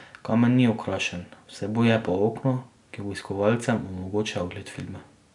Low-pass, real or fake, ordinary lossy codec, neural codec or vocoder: 10.8 kHz; real; none; none